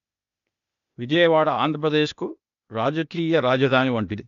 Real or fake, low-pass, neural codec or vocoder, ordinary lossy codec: fake; 7.2 kHz; codec, 16 kHz, 0.8 kbps, ZipCodec; none